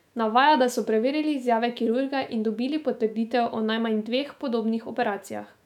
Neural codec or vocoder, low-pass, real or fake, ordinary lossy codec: autoencoder, 48 kHz, 128 numbers a frame, DAC-VAE, trained on Japanese speech; 19.8 kHz; fake; none